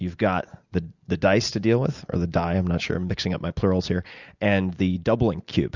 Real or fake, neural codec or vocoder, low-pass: real; none; 7.2 kHz